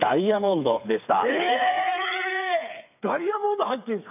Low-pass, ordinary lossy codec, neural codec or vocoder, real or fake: 3.6 kHz; none; codec, 16 kHz, 4 kbps, FreqCodec, smaller model; fake